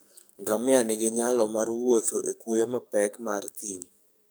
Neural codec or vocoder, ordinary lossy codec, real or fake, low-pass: codec, 44.1 kHz, 2.6 kbps, SNAC; none; fake; none